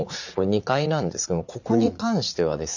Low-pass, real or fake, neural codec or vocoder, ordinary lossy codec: 7.2 kHz; fake; vocoder, 44.1 kHz, 80 mel bands, Vocos; none